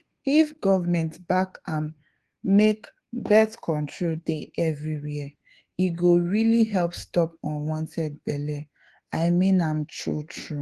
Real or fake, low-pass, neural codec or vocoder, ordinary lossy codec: fake; 10.8 kHz; codec, 24 kHz, 1.2 kbps, DualCodec; Opus, 16 kbps